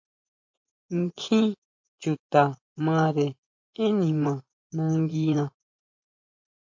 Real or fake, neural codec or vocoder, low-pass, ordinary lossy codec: fake; vocoder, 24 kHz, 100 mel bands, Vocos; 7.2 kHz; MP3, 48 kbps